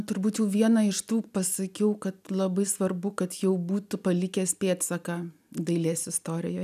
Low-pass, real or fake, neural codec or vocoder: 14.4 kHz; real; none